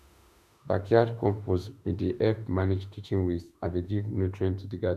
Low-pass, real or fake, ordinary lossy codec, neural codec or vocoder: 14.4 kHz; fake; none; autoencoder, 48 kHz, 32 numbers a frame, DAC-VAE, trained on Japanese speech